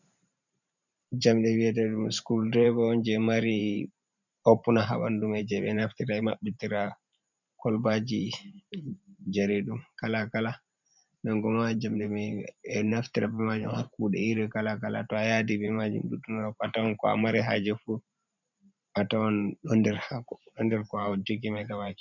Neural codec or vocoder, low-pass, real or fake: vocoder, 44.1 kHz, 128 mel bands every 512 samples, BigVGAN v2; 7.2 kHz; fake